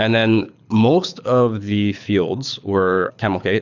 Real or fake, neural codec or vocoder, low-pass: fake; codec, 24 kHz, 6 kbps, HILCodec; 7.2 kHz